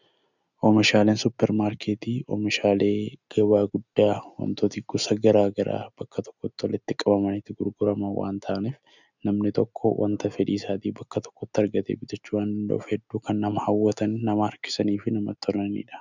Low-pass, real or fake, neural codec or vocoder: 7.2 kHz; real; none